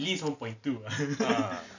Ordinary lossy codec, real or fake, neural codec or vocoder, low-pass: none; real; none; 7.2 kHz